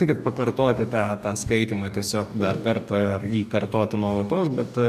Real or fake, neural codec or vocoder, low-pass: fake; codec, 44.1 kHz, 2.6 kbps, DAC; 14.4 kHz